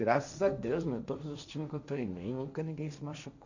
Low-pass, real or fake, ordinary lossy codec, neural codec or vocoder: 7.2 kHz; fake; none; codec, 16 kHz, 1.1 kbps, Voila-Tokenizer